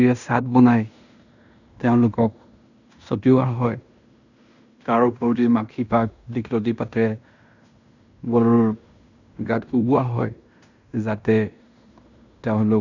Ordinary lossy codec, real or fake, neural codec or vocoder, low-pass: none; fake; codec, 16 kHz in and 24 kHz out, 0.9 kbps, LongCat-Audio-Codec, fine tuned four codebook decoder; 7.2 kHz